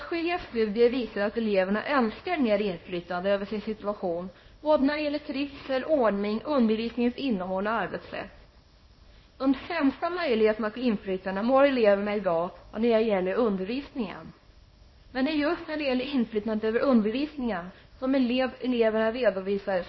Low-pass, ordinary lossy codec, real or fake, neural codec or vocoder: 7.2 kHz; MP3, 24 kbps; fake; codec, 24 kHz, 0.9 kbps, WavTokenizer, small release